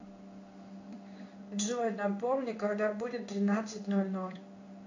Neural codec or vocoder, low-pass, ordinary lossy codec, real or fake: codec, 16 kHz in and 24 kHz out, 1 kbps, XY-Tokenizer; 7.2 kHz; AAC, 48 kbps; fake